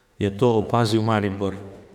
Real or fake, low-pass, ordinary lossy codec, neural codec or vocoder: fake; 19.8 kHz; none; autoencoder, 48 kHz, 32 numbers a frame, DAC-VAE, trained on Japanese speech